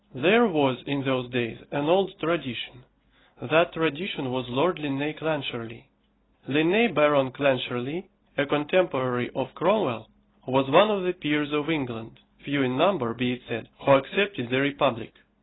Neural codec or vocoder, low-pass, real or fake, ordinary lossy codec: none; 7.2 kHz; real; AAC, 16 kbps